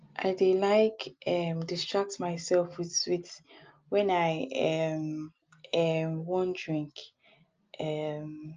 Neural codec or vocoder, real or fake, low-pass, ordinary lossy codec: none; real; 7.2 kHz; Opus, 24 kbps